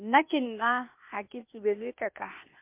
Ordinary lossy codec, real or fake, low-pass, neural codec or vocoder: MP3, 32 kbps; fake; 3.6 kHz; codec, 16 kHz, 0.8 kbps, ZipCodec